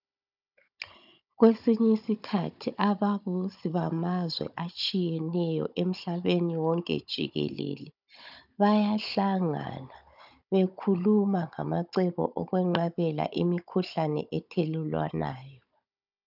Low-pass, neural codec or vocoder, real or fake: 5.4 kHz; codec, 16 kHz, 16 kbps, FunCodec, trained on Chinese and English, 50 frames a second; fake